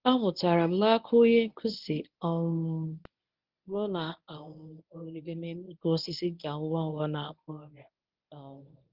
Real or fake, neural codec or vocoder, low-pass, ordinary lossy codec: fake; codec, 24 kHz, 0.9 kbps, WavTokenizer, medium speech release version 1; 5.4 kHz; Opus, 24 kbps